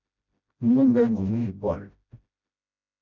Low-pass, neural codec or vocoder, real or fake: 7.2 kHz; codec, 16 kHz, 0.5 kbps, FreqCodec, smaller model; fake